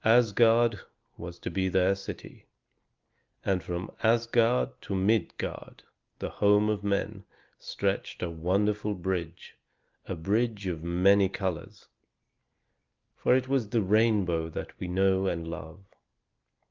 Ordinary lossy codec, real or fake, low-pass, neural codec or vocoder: Opus, 32 kbps; real; 7.2 kHz; none